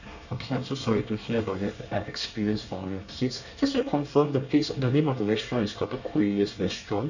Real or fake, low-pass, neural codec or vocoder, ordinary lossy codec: fake; 7.2 kHz; codec, 24 kHz, 1 kbps, SNAC; none